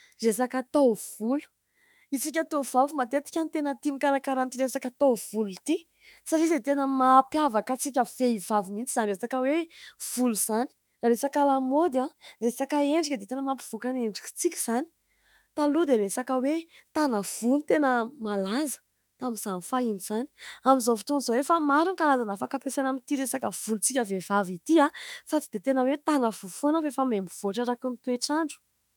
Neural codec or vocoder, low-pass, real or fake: autoencoder, 48 kHz, 32 numbers a frame, DAC-VAE, trained on Japanese speech; 19.8 kHz; fake